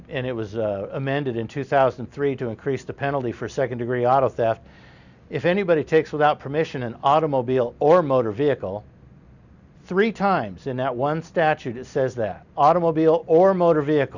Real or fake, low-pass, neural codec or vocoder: real; 7.2 kHz; none